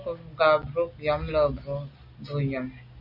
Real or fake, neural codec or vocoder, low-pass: real; none; 5.4 kHz